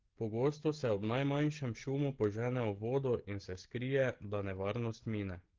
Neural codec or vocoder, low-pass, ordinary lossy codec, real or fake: codec, 16 kHz, 16 kbps, FreqCodec, smaller model; 7.2 kHz; Opus, 16 kbps; fake